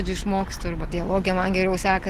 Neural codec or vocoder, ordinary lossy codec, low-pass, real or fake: none; Opus, 16 kbps; 14.4 kHz; real